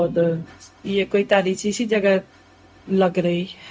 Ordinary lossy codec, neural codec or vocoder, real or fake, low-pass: none; codec, 16 kHz, 0.4 kbps, LongCat-Audio-Codec; fake; none